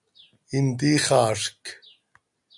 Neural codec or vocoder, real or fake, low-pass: none; real; 10.8 kHz